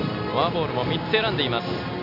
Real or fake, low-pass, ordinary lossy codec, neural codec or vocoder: real; 5.4 kHz; none; none